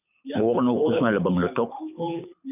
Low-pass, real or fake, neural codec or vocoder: 3.6 kHz; fake; codec, 24 kHz, 6 kbps, HILCodec